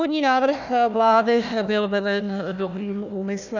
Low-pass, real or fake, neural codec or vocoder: 7.2 kHz; fake; codec, 16 kHz, 1 kbps, FunCodec, trained on Chinese and English, 50 frames a second